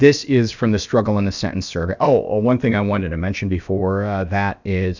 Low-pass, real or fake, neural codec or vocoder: 7.2 kHz; fake; codec, 16 kHz, about 1 kbps, DyCAST, with the encoder's durations